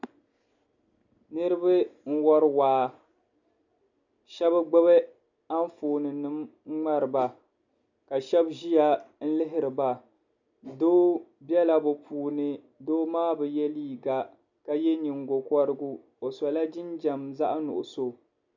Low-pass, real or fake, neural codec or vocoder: 7.2 kHz; real; none